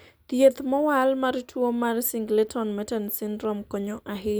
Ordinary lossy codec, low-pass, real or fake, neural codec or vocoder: none; none; real; none